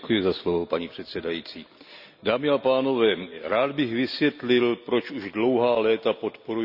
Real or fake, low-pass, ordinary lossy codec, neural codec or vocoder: real; 5.4 kHz; none; none